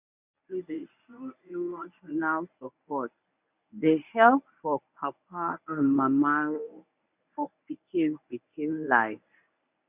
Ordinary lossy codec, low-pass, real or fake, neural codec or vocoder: none; 3.6 kHz; fake; codec, 24 kHz, 0.9 kbps, WavTokenizer, medium speech release version 1